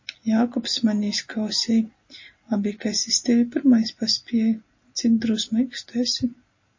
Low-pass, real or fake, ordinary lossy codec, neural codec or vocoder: 7.2 kHz; real; MP3, 32 kbps; none